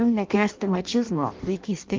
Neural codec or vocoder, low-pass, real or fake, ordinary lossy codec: codec, 16 kHz in and 24 kHz out, 0.6 kbps, FireRedTTS-2 codec; 7.2 kHz; fake; Opus, 32 kbps